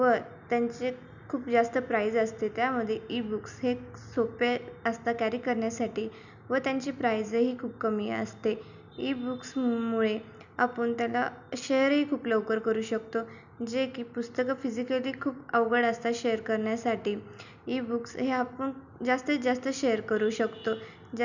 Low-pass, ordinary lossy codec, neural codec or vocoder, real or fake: 7.2 kHz; none; none; real